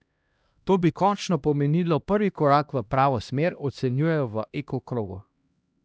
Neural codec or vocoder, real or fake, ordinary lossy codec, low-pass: codec, 16 kHz, 1 kbps, X-Codec, HuBERT features, trained on LibriSpeech; fake; none; none